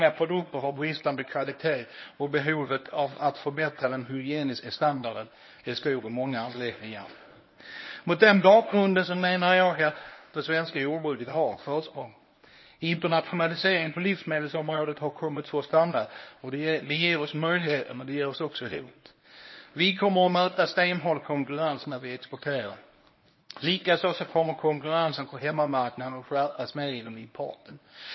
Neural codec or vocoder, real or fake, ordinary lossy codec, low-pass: codec, 24 kHz, 0.9 kbps, WavTokenizer, medium speech release version 1; fake; MP3, 24 kbps; 7.2 kHz